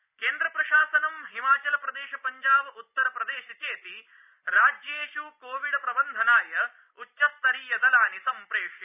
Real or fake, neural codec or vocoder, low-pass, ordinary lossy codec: real; none; 3.6 kHz; none